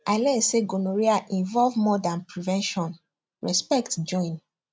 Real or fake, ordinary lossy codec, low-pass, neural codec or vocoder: real; none; none; none